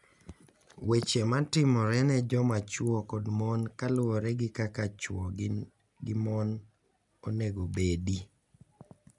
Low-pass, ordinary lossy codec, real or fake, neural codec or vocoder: 10.8 kHz; none; real; none